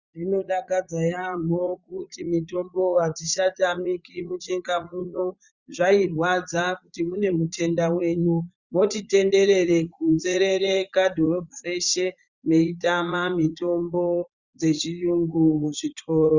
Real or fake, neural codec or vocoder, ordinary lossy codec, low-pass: fake; vocoder, 44.1 kHz, 80 mel bands, Vocos; Opus, 64 kbps; 7.2 kHz